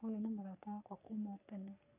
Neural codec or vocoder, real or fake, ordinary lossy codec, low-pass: codec, 44.1 kHz, 3.4 kbps, Pupu-Codec; fake; none; 3.6 kHz